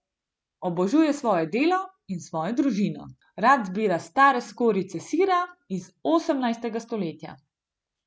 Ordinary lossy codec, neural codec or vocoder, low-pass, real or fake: none; none; none; real